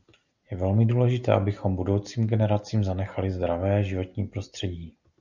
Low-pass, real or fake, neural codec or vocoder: 7.2 kHz; real; none